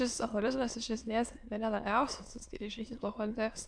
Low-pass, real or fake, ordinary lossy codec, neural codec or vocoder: 9.9 kHz; fake; AAC, 64 kbps; autoencoder, 22.05 kHz, a latent of 192 numbers a frame, VITS, trained on many speakers